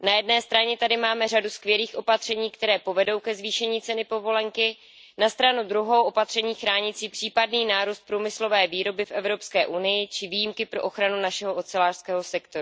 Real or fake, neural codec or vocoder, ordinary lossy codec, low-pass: real; none; none; none